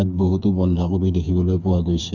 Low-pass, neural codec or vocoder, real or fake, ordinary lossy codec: 7.2 kHz; codec, 16 kHz, 4 kbps, FreqCodec, smaller model; fake; none